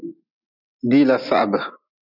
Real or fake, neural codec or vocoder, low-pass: real; none; 5.4 kHz